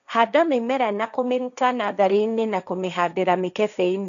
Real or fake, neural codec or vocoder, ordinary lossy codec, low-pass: fake; codec, 16 kHz, 1.1 kbps, Voila-Tokenizer; none; 7.2 kHz